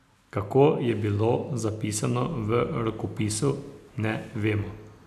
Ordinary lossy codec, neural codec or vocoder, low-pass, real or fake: none; none; 14.4 kHz; real